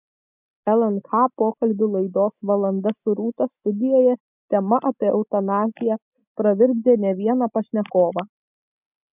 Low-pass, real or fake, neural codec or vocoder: 3.6 kHz; real; none